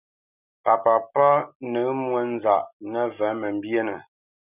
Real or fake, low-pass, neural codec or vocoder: real; 3.6 kHz; none